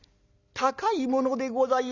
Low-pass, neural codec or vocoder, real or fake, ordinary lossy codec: 7.2 kHz; none; real; Opus, 64 kbps